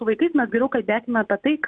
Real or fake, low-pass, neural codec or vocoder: real; 9.9 kHz; none